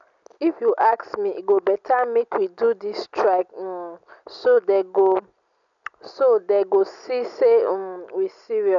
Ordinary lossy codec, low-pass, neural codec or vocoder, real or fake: none; 7.2 kHz; none; real